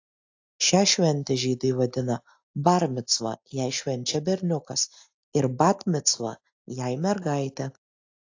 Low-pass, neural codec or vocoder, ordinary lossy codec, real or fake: 7.2 kHz; none; AAC, 48 kbps; real